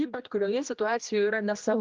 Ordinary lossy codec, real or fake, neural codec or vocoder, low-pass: Opus, 24 kbps; fake; codec, 16 kHz, 1 kbps, X-Codec, HuBERT features, trained on general audio; 7.2 kHz